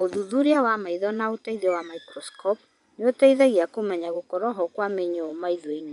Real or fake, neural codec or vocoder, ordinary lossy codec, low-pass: fake; vocoder, 24 kHz, 100 mel bands, Vocos; none; 10.8 kHz